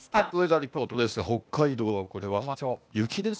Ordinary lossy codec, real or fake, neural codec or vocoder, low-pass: none; fake; codec, 16 kHz, 0.8 kbps, ZipCodec; none